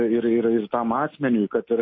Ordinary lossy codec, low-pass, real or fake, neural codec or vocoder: MP3, 24 kbps; 7.2 kHz; real; none